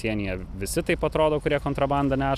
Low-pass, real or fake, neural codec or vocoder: 14.4 kHz; real; none